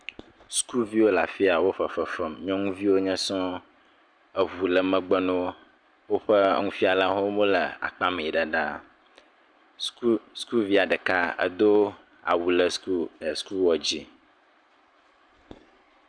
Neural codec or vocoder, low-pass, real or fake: none; 9.9 kHz; real